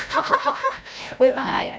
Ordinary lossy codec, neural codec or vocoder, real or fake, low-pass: none; codec, 16 kHz, 0.5 kbps, FreqCodec, larger model; fake; none